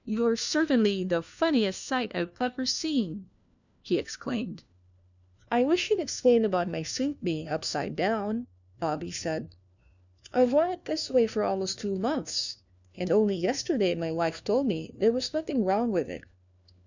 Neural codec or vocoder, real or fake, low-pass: codec, 16 kHz, 1 kbps, FunCodec, trained on LibriTTS, 50 frames a second; fake; 7.2 kHz